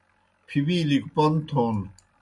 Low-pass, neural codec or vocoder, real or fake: 10.8 kHz; none; real